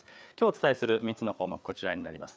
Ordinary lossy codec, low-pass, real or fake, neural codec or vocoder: none; none; fake; codec, 16 kHz, 4 kbps, FreqCodec, larger model